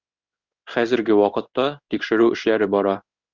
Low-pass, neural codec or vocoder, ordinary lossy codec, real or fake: 7.2 kHz; codec, 16 kHz in and 24 kHz out, 1 kbps, XY-Tokenizer; Opus, 64 kbps; fake